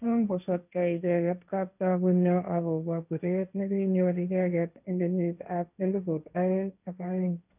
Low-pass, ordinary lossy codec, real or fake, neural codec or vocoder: 3.6 kHz; none; fake; codec, 16 kHz, 1.1 kbps, Voila-Tokenizer